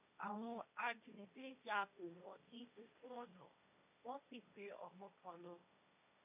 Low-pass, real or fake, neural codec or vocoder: 3.6 kHz; fake; codec, 16 kHz, 1.1 kbps, Voila-Tokenizer